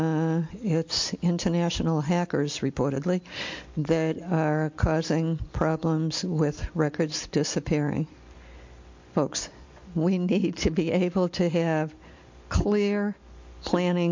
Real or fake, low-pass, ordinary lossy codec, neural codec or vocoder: real; 7.2 kHz; MP3, 48 kbps; none